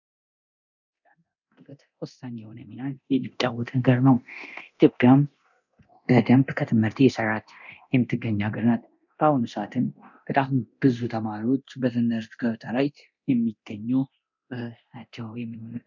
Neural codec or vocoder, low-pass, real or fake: codec, 24 kHz, 0.9 kbps, DualCodec; 7.2 kHz; fake